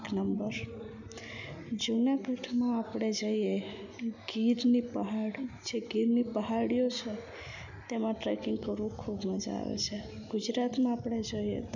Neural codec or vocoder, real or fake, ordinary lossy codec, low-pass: none; real; none; 7.2 kHz